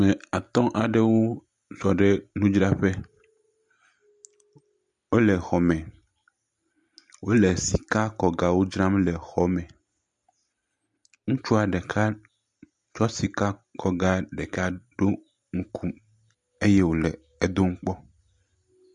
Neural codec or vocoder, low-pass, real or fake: none; 9.9 kHz; real